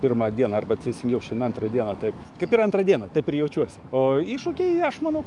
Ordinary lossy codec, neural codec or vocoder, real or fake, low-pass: MP3, 96 kbps; codec, 44.1 kHz, 7.8 kbps, DAC; fake; 10.8 kHz